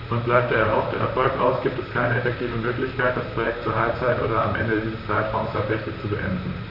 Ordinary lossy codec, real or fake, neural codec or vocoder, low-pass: none; fake; vocoder, 44.1 kHz, 128 mel bands, Pupu-Vocoder; 5.4 kHz